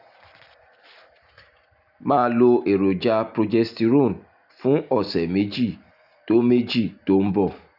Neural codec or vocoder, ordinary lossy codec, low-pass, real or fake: none; none; 5.4 kHz; real